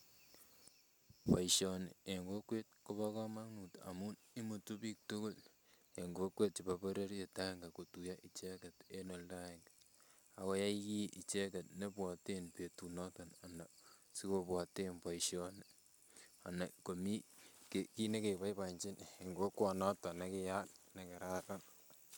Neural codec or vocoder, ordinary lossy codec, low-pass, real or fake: none; none; none; real